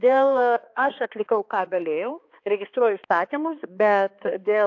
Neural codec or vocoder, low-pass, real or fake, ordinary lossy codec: codec, 16 kHz, 2 kbps, X-Codec, HuBERT features, trained on balanced general audio; 7.2 kHz; fake; AAC, 48 kbps